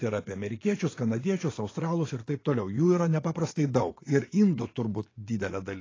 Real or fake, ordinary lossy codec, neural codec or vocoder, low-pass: fake; AAC, 32 kbps; vocoder, 24 kHz, 100 mel bands, Vocos; 7.2 kHz